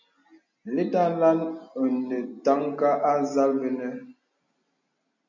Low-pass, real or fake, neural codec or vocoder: 7.2 kHz; real; none